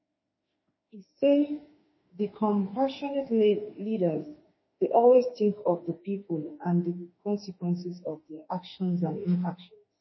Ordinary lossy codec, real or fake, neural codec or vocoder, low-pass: MP3, 24 kbps; fake; autoencoder, 48 kHz, 32 numbers a frame, DAC-VAE, trained on Japanese speech; 7.2 kHz